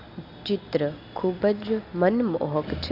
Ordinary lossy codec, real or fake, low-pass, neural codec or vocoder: none; real; 5.4 kHz; none